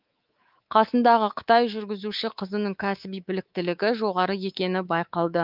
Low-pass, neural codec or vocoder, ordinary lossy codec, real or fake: 5.4 kHz; codec, 24 kHz, 3.1 kbps, DualCodec; Opus, 24 kbps; fake